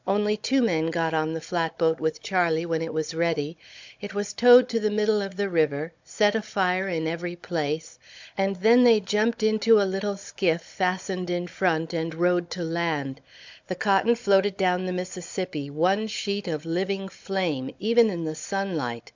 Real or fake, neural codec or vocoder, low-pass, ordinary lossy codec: fake; codec, 16 kHz, 16 kbps, FunCodec, trained on Chinese and English, 50 frames a second; 7.2 kHz; MP3, 64 kbps